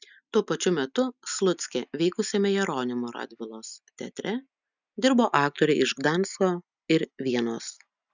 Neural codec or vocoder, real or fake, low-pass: none; real; 7.2 kHz